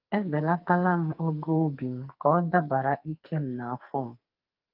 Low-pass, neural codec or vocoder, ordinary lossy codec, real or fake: 5.4 kHz; codec, 44.1 kHz, 2.6 kbps, SNAC; Opus, 32 kbps; fake